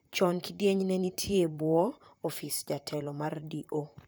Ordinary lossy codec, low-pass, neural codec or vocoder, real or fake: none; none; vocoder, 44.1 kHz, 128 mel bands, Pupu-Vocoder; fake